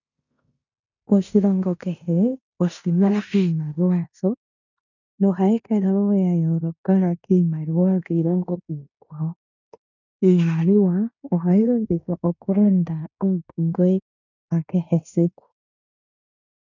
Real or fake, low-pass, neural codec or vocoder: fake; 7.2 kHz; codec, 16 kHz in and 24 kHz out, 0.9 kbps, LongCat-Audio-Codec, fine tuned four codebook decoder